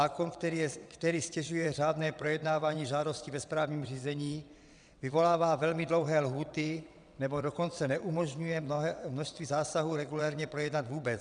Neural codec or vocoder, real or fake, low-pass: vocoder, 22.05 kHz, 80 mel bands, WaveNeXt; fake; 9.9 kHz